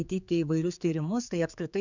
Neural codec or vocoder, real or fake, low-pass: codec, 16 kHz, 4 kbps, X-Codec, HuBERT features, trained on general audio; fake; 7.2 kHz